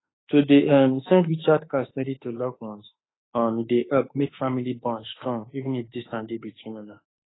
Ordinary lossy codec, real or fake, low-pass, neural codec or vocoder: AAC, 16 kbps; fake; 7.2 kHz; autoencoder, 48 kHz, 32 numbers a frame, DAC-VAE, trained on Japanese speech